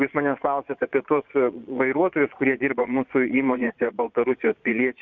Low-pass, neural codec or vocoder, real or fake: 7.2 kHz; vocoder, 22.05 kHz, 80 mel bands, Vocos; fake